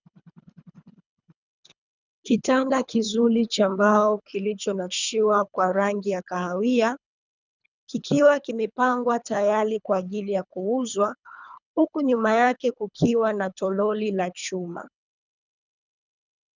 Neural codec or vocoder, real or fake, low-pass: codec, 24 kHz, 3 kbps, HILCodec; fake; 7.2 kHz